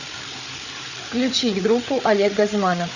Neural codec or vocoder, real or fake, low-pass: codec, 16 kHz, 8 kbps, FreqCodec, larger model; fake; 7.2 kHz